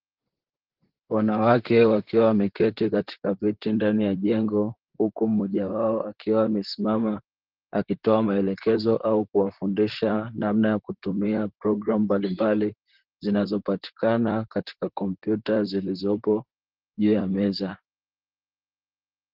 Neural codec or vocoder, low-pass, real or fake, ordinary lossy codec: vocoder, 44.1 kHz, 128 mel bands, Pupu-Vocoder; 5.4 kHz; fake; Opus, 16 kbps